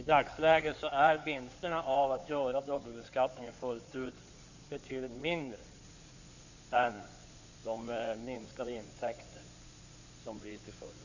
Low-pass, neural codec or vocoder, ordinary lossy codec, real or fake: 7.2 kHz; codec, 16 kHz in and 24 kHz out, 2.2 kbps, FireRedTTS-2 codec; none; fake